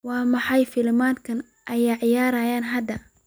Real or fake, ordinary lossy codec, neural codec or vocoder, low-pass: real; none; none; none